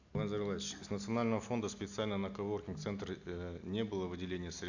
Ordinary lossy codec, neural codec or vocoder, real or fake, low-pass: none; none; real; 7.2 kHz